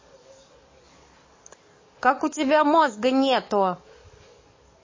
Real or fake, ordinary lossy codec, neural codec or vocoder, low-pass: fake; MP3, 32 kbps; codec, 44.1 kHz, 7.8 kbps, DAC; 7.2 kHz